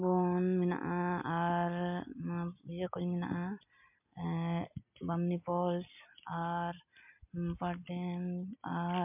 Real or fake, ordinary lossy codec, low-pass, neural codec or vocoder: real; MP3, 32 kbps; 3.6 kHz; none